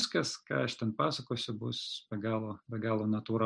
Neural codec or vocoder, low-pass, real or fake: none; 9.9 kHz; real